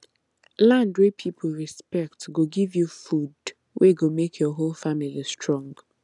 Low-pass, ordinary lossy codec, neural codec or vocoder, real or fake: 10.8 kHz; none; none; real